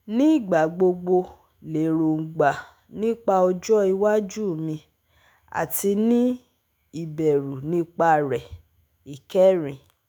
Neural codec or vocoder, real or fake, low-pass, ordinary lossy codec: autoencoder, 48 kHz, 128 numbers a frame, DAC-VAE, trained on Japanese speech; fake; none; none